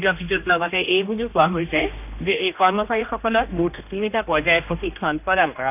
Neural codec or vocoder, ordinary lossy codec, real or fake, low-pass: codec, 16 kHz, 1 kbps, X-Codec, HuBERT features, trained on general audio; none; fake; 3.6 kHz